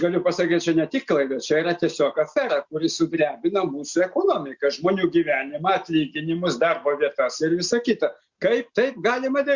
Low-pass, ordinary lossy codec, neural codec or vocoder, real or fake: 7.2 kHz; Opus, 64 kbps; none; real